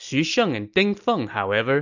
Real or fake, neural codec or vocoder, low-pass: real; none; 7.2 kHz